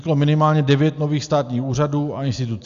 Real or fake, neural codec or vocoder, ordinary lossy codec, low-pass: real; none; Opus, 64 kbps; 7.2 kHz